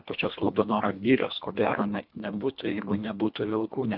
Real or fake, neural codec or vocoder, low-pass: fake; codec, 24 kHz, 1.5 kbps, HILCodec; 5.4 kHz